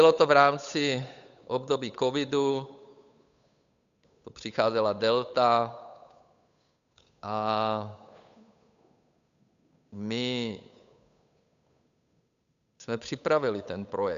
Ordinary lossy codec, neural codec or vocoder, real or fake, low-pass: AAC, 96 kbps; codec, 16 kHz, 8 kbps, FunCodec, trained on Chinese and English, 25 frames a second; fake; 7.2 kHz